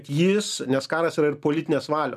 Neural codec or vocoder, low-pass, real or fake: none; 14.4 kHz; real